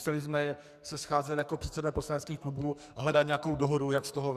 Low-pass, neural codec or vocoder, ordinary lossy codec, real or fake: 14.4 kHz; codec, 44.1 kHz, 2.6 kbps, SNAC; Opus, 64 kbps; fake